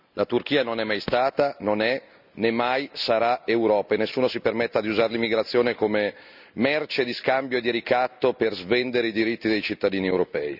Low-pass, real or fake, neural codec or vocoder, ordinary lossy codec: 5.4 kHz; real; none; none